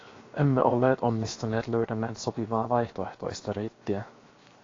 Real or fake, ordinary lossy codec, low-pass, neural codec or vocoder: fake; AAC, 32 kbps; 7.2 kHz; codec, 16 kHz, 0.7 kbps, FocalCodec